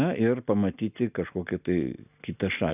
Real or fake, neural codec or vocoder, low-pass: real; none; 3.6 kHz